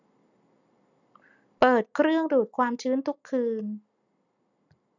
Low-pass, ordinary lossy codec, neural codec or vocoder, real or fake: 7.2 kHz; none; none; real